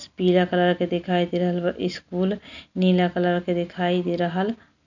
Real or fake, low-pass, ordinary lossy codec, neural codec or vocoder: real; 7.2 kHz; none; none